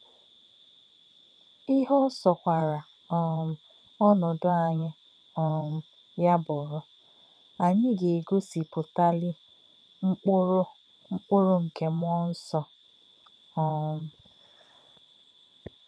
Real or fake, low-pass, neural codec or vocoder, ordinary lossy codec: fake; none; vocoder, 22.05 kHz, 80 mel bands, WaveNeXt; none